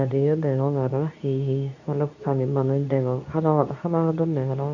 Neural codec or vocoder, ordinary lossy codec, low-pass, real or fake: codec, 24 kHz, 0.9 kbps, WavTokenizer, medium speech release version 2; none; 7.2 kHz; fake